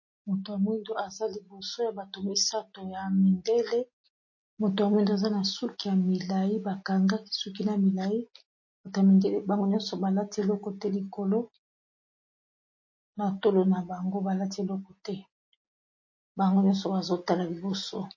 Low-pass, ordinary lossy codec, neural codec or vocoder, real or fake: 7.2 kHz; MP3, 32 kbps; none; real